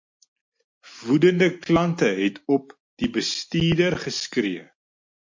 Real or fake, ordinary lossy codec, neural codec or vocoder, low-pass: real; MP3, 48 kbps; none; 7.2 kHz